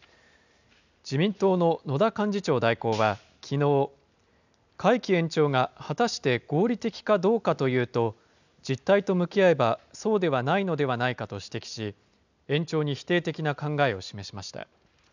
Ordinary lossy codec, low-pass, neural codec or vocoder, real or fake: none; 7.2 kHz; none; real